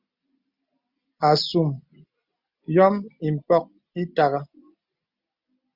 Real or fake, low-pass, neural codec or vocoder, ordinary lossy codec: real; 5.4 kHz; none; Opus, 64 kbps